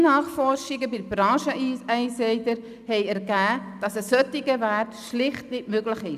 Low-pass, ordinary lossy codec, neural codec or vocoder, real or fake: 14.4 kHz; none; none; real